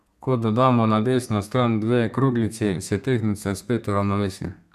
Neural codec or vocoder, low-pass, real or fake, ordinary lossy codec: codec, 32 kHz, 1.9 kbps, SNAC; 14.4 kHz; fake; none